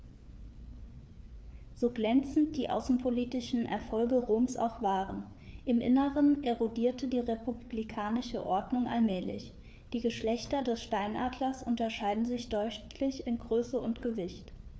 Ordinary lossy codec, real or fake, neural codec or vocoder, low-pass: none; fake; codec, 16 kHz, 4 kbps, FreqCodec, larger model; none